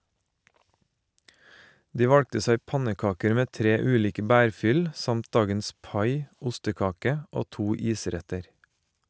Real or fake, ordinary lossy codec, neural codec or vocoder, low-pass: real; none; none; none